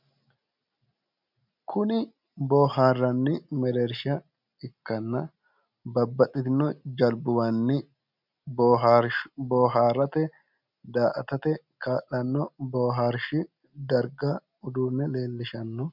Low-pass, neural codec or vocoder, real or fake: 5.4 kHz; none; real